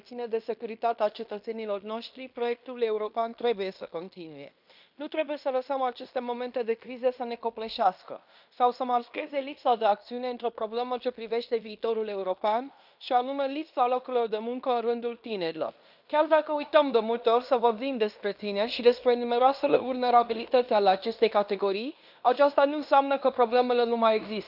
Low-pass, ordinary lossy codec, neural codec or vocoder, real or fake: 5.4 kHz; none; codec, 16 kHz in and 24 kHz out, 0.9 kbps, LongCat-Audio-Codec, fine tuned four codebook decoder; fake